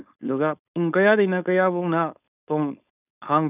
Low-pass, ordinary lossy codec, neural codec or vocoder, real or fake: 3.6 kHz; none; codec, 16 kHz, 4.8 kbps, FACodec; fake